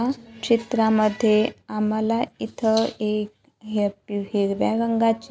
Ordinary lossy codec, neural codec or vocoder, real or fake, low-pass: none; none; real; none